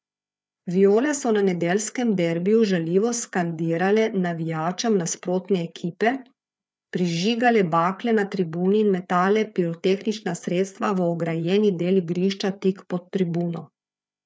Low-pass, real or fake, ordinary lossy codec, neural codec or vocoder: none; fake; none; codec, 16 kHz, 4 kbps, FreqCodec, larger model